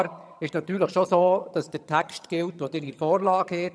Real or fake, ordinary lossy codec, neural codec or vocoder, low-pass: fake; none; vocoder, 22.05 kHz, 80 mel bands, HiFi-GAN; none